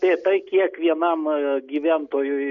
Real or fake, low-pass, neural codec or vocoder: real; 7.2 kHz; none